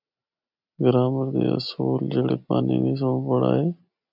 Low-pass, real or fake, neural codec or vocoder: 5.4 kHz; real; none